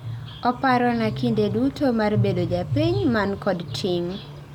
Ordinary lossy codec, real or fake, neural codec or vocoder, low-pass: none; real; none; 19.8 kHz